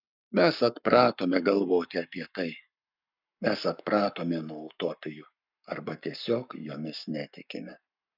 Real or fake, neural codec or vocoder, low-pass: fake; codec, 44.1 kHz, 7.8 kbps, Pupu-Codec; 5.4 kHz